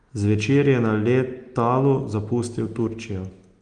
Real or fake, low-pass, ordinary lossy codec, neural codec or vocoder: real; 9.9 kHz; Opus, 24 kbps; none